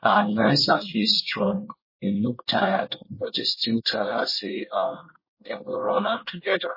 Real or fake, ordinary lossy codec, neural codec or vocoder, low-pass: fake; MP3, 24 kbps; codec, 24 kHz, 1 kbps, SNAC; 5.4 kHz